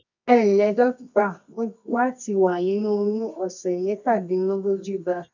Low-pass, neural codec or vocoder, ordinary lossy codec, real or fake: 7.2 kHz; codec, 24 kHz, 0.9 kbps, WavTokenizer, medium music audio release; none; fake